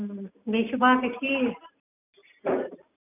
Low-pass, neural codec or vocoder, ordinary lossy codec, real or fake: 3.6 kHz; none; none; real